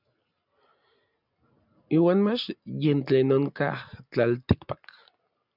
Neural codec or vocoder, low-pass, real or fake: none; 5.4 kHz; real